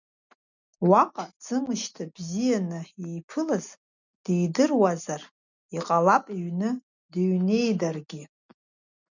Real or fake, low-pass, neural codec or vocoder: real; 7.2 kHz; none